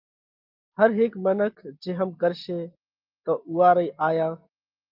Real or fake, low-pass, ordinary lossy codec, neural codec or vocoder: real; 5.4 kHz; Opus, 32 kbps; none